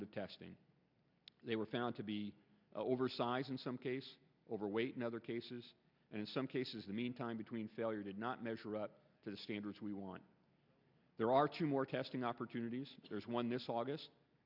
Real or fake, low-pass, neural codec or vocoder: real; 5.4 kHz; none